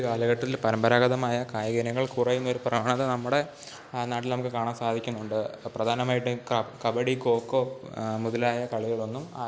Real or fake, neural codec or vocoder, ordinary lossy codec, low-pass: real; none; none; none